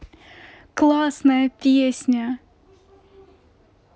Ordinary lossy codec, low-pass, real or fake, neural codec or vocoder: none; none; real; none